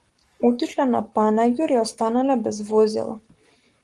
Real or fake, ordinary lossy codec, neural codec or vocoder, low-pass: fake; Opus, 24 kbps; codec, 44.1 kHz, 7.8 kbps, Pupu-Codec; 10.8 kHz